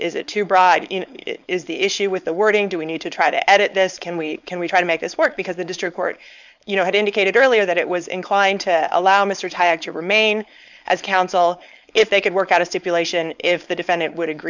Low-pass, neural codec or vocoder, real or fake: 7.2 kHz; codec, 16 kHz, 4.8 kbps, FACodec; fake